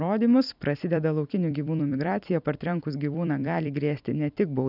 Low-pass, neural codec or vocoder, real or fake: 5.4 kHz; vocoder, 44.1 kHz, 128 mel bands every 256 samples, BigVGAN v2; fake